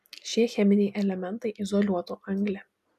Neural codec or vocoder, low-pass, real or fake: none; 14.4 kHz; real